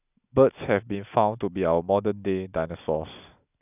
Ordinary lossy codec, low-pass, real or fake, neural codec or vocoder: none; 3.6 kHz; real; none